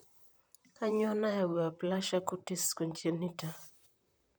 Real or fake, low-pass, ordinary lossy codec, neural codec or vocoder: fake; none; none; vocoder, 44.1 kHz, 128 mel bands, Pupu-Vocoder